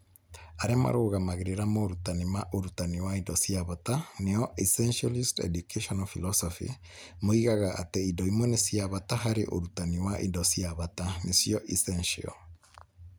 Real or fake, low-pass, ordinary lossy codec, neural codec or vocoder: real; none; none; none